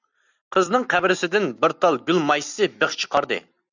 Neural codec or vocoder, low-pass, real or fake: none; 7.2 kHz; real